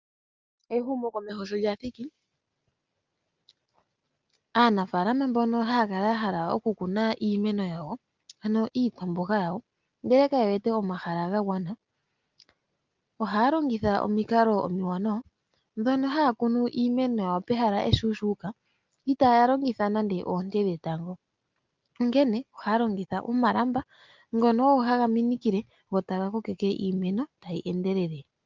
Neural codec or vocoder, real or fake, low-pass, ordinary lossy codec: none; real; 7.2 kHz; Opus, 24 kbps